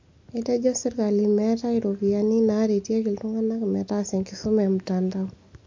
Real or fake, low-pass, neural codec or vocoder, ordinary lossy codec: real; 7.2 kHz; none; MP3, 48 kbps